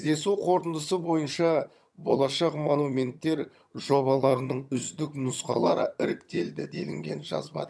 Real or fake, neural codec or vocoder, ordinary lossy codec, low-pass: fake; vocoder, 22.05 kHz, 80 mel bands, HiFi-GAN; none; none